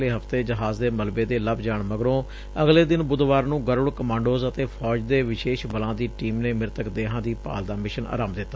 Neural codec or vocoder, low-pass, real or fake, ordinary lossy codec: none; none; real; none